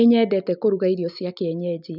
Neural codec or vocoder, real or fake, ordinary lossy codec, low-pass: none; real; none; 5.4 kHz